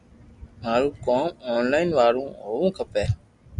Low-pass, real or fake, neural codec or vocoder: 10.8 kHz; real; none